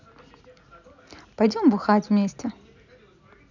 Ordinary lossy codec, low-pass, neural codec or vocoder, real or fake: none; 7.2 kHz; none; real